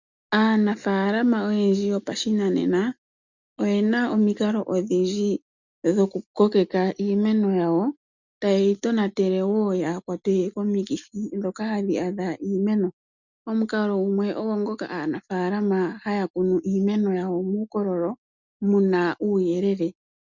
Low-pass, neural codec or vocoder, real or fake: 7.2 kHz; none; real